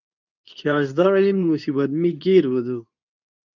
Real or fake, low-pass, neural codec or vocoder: fake; 7.2 kHz; codec, 24 kHz, 0.9 kbps, WavTokenizer, medium speech release version 2